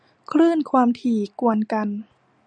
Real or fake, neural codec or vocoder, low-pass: real; none; 9.9 kHz